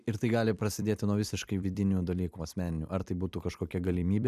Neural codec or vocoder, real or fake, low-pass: vocoder, 44.1 kHz, 128 mel bands every 256 samples, BigVGAN v2; fake; 14.4 kHz